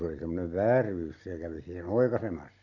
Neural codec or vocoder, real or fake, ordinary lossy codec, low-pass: none; real; none; 7.2 kHz